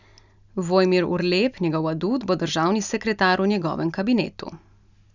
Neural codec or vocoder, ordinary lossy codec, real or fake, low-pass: none; none; real; 7.2 kHz